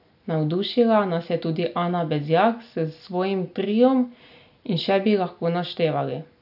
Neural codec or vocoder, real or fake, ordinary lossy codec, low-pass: none; real; AAC, 48 kbps; 5.4 kHz